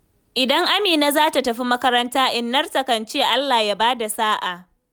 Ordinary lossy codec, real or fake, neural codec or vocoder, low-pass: none; real; none; none